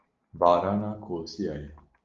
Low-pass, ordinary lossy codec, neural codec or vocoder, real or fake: 7.2 kHz; Opus, 32 kbps; none; real